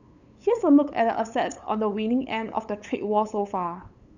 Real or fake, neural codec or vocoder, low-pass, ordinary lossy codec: fake; codec, 16 kHz, 8 kbps, FunCodec, trained on LibriTTS, 25 frames a second; 7.2 kHz; none